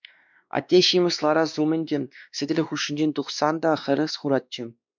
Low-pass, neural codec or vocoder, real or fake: 7.2 kHz; codec, 16 kHz, 2 kbps, X-Codec, WavLM features, trained on Multilingual LibriSpeech; fake